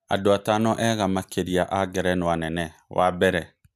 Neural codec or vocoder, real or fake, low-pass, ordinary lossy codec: none; real; 14.4 kHz; none